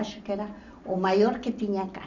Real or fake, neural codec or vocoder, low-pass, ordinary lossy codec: real; none; 7.2 kHz; none